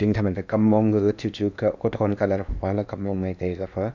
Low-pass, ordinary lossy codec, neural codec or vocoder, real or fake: 7.2 kHz; none; codec, 16 kHz in and 24 kHz out, 0.8 kbps, FocalCodec, streaming, 65536 codes; fake